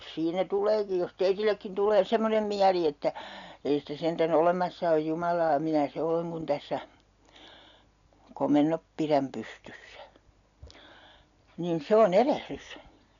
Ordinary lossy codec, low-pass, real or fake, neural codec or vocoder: none; 7.2 kHz; real; none